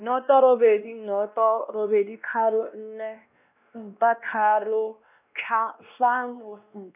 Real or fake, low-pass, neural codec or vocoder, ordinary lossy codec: fake; 3.6 kHz; codec, 16 kHz, 1 kbps, X-Codec, WavLM features, trained on Multilingual LibriSpeech; none